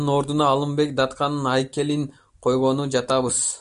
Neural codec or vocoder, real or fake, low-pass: none; real; 10.8 kHz